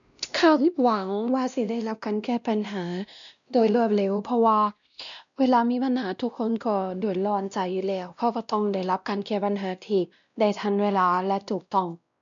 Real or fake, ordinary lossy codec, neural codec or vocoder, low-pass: fake; none; codec, 16 kHz, 1 kbps, X-Codec, WavLM features, trained on Multilingual LibriSpeech; 7.2 kHz